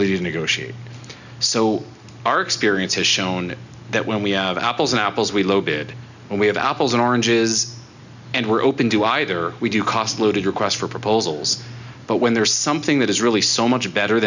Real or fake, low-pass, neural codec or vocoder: real; 7.2 kHz; none